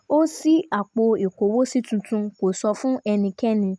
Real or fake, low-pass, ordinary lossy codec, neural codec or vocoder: real; none; none; none